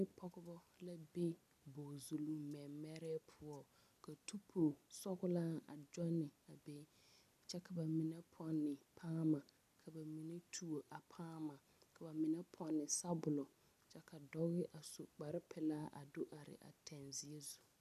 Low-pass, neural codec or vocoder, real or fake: 14.4 kHz; none; real